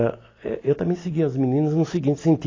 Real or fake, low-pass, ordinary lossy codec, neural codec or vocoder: real; 7.2 kHz; AAC, 32 kbps; none